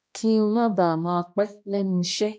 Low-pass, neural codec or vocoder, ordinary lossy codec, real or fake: none; codec, 16 kHz, 1 kbps, X-Codec, HuBERT features, trained on balanced general audio; none; fake